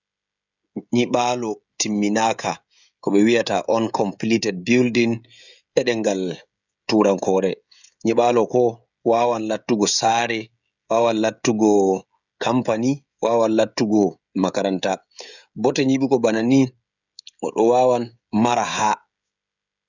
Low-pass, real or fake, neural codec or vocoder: 7.2 kHz; fake; codec, 16 kHz, 16 kbps, FreqCodec, smaller model